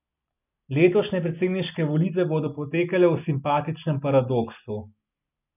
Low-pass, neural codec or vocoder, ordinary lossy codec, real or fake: 3.6 kHz; none; none; real